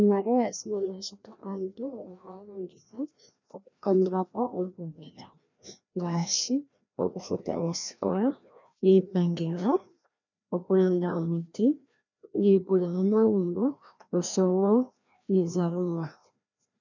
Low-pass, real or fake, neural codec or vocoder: 7.2 kHz; fake; codec, 16 kHz, 1 kbps, FreqCodec, larger model